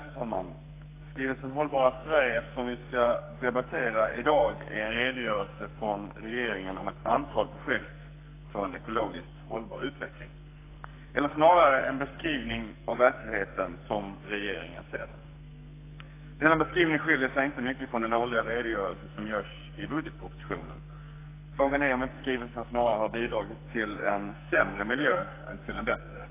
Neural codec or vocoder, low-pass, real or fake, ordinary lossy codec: codec, 44.1 kHz, 2.6 kbps, SNAC; 3.6 kHz; fake; AAC, 24 kbps